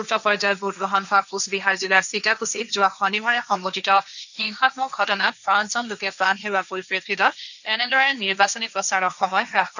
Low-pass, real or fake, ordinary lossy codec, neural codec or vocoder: 7.2 kHz; fake; none; codec, 16 kHz, 1.1 kbps, Voila-Tokenizer